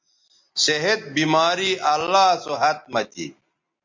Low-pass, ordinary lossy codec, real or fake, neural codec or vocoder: 7.2 kHz; MP3, 48 kbps; real; none